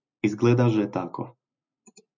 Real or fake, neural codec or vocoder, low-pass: real; none; 7.2 kHz